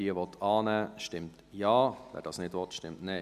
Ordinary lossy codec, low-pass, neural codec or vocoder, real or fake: none; 14.4 kHz; none; real